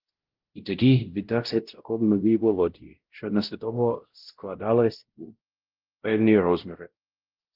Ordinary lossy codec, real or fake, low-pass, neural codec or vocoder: Opus, 16 kbps; fake; 5.4 kHz; codec, 16 kHz, 0.5 kbps, X-Codec, WavLM features, trained on Multilingual LibriSpeech